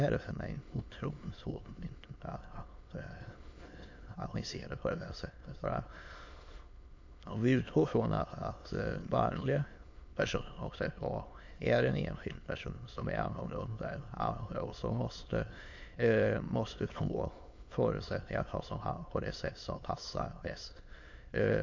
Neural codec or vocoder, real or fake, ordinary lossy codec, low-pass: autoencoder, 22.05 kHz, a latent of 192 numbers a frame, VITS, trained on many speakers; fake; MP3, 48 kbps; 7.2 kHz